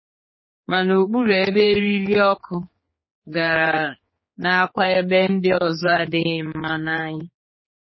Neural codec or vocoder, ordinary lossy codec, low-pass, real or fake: codec, 16 kHz, 4 kbps, X-Codec, HuBERT features, trained on general audio; MP3, 24 kbps; 7.2 kHz; fake